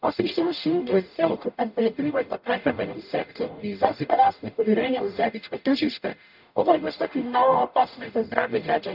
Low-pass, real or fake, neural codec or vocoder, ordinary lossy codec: 5.4 kHz; fake; codec, 44.1 kHz, 0.9 kbps, DAC; none